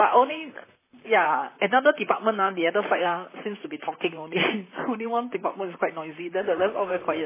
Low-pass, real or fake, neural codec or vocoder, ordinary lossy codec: 3.6 kHz; fake; vocoder, 44.1 kHz, 128 mel bands, Pupu-Vocoder; MP3, 16 kbps